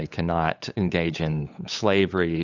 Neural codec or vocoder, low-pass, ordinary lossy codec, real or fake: codec, 16 kHz, 8 kbps, FunCodec, trained on LibriTTS, 25 frames a second; 7.2 kHz; AAC, 48 kbps; fake